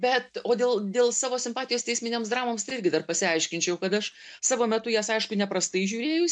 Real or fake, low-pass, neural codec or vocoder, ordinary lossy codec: real; 9.9 kHz; none; MP3, 64 kbps